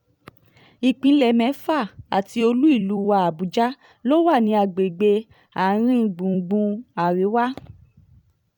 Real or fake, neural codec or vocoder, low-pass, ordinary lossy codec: fake; vocoder, 44.1 kHz, 128 mel bands every 256 samples, BigVGAN v2; 19.8 kHz; none